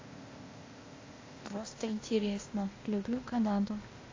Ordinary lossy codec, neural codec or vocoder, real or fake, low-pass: AAC, 32 kbps; codec, 16 kHz, 0.8 kbps, ZipCodec; fake; 7.2 kHz